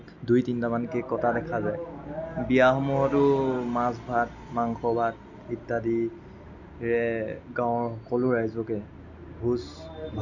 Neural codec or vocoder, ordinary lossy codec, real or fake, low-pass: none; none; real; 7.2 kHz